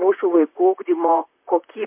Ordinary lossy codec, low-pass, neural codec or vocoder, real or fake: MP3, 32 kbps; 3.6 kHz; vocoder, 44.1 kHz, 128 mel bands, Pupu-Vocoder; fake